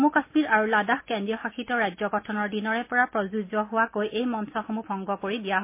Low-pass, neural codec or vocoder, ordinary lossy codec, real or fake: 3.6 kHz; none; MP3, 24 kbps; real